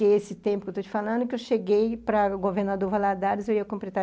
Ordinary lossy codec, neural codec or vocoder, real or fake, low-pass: none; none; real; none